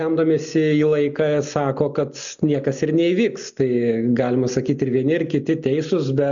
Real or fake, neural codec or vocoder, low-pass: real; none; 7.2 kHz